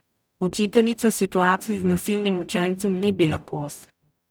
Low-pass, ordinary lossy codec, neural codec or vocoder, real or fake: none; none; codec, 44.1 kHz, 0.9 kbps, DAC; fake